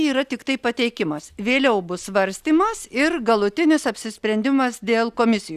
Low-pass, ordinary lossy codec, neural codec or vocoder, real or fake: 14.4 kHz; Opus, 64 kbps; none; real